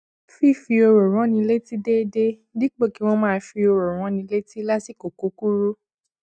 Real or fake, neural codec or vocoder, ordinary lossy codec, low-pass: real; none; none; 9.9 kHz